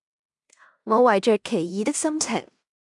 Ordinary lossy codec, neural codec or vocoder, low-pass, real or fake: MP3, 96 kbps; codec, 16 kHz in and 24 kHz out, 0.9 kbps, LongCat-Audio-Codec, fine tuned four codebook decoder; 10.8 kHz; fake